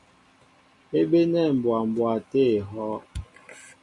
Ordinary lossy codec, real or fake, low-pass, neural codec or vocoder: MP3, 96 kbps; real; 10.8 kHz; none